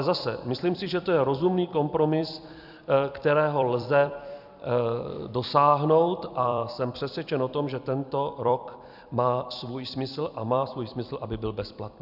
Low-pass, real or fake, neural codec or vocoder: 5.4 kHz; fake; vocoder, 44.1 kHz, 128 mel bands every 256 samples, BigVGAN v2